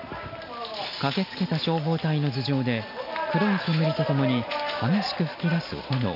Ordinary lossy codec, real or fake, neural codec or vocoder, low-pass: none; real; none; 5.4 kHz